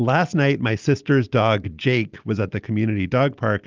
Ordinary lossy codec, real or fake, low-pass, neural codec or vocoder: Opus, 24 kbps; real; 7.2 kHz; none